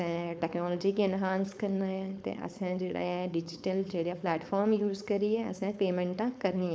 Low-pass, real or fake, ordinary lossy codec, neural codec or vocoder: none; fake; none; codec, 16 kHz, 4.8 kbps, FACodec